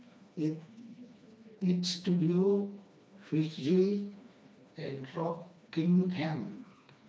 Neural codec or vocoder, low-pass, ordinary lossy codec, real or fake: codec, 16 kHz, 2 kbps, FreqCodec, smaller model; none; none; fake